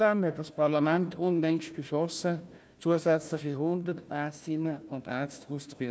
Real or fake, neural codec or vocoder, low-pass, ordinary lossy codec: fake; codec, 16 kHz, 1 kbps, FunCodec, trained on Chinese and English, 50 frames a second; none; none